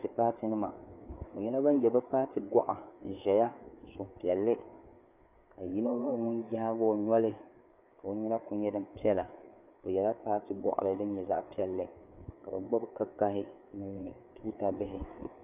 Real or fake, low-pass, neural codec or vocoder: fake; 3.6 kHz; codec, 16 kHz, 4 kbps, FreqCodec, larger model